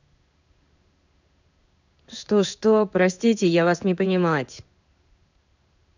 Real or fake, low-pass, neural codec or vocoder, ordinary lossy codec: fake; 7.2 kHz; codec, 16 kHz in and 24 kHz out, 1 kbps, XY-Tokenizer; none